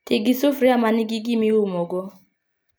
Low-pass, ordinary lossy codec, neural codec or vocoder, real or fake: none; none; none; real